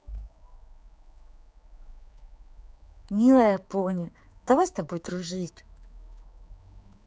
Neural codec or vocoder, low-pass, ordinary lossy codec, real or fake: codec, 16 kHz, 4 kbps, X-Codec, HuBERT features, trained on general audio; none; none; fake